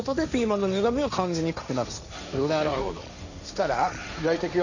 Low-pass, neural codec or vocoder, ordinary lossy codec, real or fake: 7.2 kHz; codec, 16 kHz, 1.1 kbps, Voila-Tokenizer; none; fake